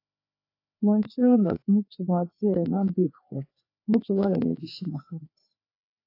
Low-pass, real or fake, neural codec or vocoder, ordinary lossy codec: 5.4 kHz; fake; codec, 16 kHz, 2 kbps, FreqCodec, larger model; AAC, 32 kbps